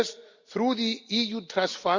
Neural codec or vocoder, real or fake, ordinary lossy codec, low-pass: none; real; Opus, 64 kbps; 7.2 kHz